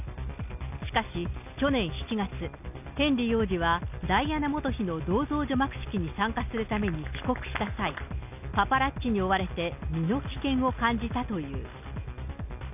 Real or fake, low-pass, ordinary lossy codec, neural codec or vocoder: real; 3.6 kHz; none; none